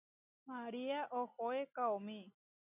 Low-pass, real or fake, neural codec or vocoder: 3.6 kHz; real; none